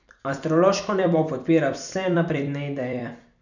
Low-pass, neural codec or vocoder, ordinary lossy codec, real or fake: 7.2 kHz; vocoder, 44.1 kHz, 128 mel bands every 256 samples, BigVGAN v2; none; fake